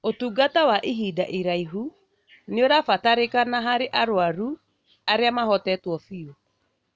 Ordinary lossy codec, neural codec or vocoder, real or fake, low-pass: none; none; real; none